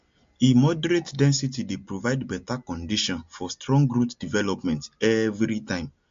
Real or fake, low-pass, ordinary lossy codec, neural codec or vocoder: real; 7.2 kHz; MP3, 48 kbps; none